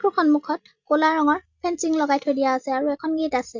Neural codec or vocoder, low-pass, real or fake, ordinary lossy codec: none; 7.2 kHz; real; Opus, 64 kbps